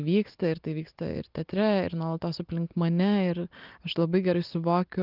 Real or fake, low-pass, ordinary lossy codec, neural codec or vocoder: real; 5.4 kHz; Opus, 32 kbps; none